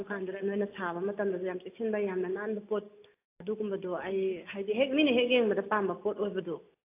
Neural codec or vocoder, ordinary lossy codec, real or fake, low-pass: none; AAC, 24 kbps; real; 3.6 kHz